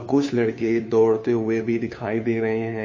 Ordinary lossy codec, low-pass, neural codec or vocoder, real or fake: MP3, 32 kbps; 7.2 kHz; codec, 16 kHz, 2 kbps, FunCodec, trained on Chinese and English, 25 frames a second; fake